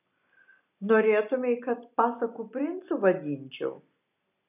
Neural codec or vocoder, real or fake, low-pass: none; real; 3.6 kHz